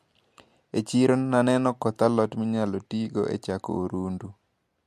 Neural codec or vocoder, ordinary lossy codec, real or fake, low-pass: none; MP3, 64 kbps; real; 14.4 kHz